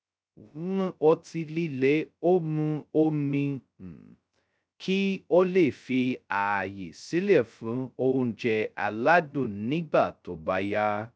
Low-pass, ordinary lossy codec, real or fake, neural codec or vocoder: none; none; fake; codec, 16 kHz, 0.2 kbps, FocalCodec